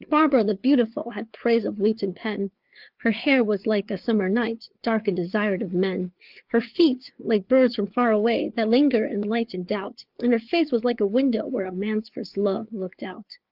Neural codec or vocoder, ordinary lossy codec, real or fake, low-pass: codec, 16 kHz, 4 kbps, FreqCodec, larger model; Opus, 32 kbps; fake; 5.4 kHz